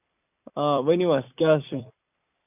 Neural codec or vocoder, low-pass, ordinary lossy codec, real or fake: vocoder, 44.1 kHz, 128 mel bands every 256 samples, BigVGAN v2; 3.6 kHz; none; fake